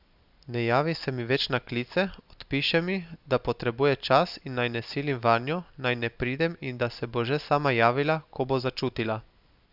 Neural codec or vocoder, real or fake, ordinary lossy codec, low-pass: none; real; Opus, 64 kbps; 5.4 kHz